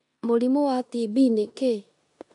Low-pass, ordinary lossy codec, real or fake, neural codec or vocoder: 10.8 kHz; none; fake; codec, 16 kHz in and 24 kHz out, 0.9 kbps, LongCat-Audio-Codec, four codebook decoder